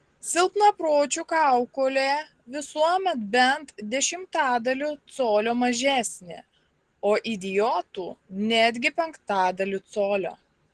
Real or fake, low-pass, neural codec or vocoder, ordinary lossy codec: real; 9.9 kHz; none; Opus, 16 kbps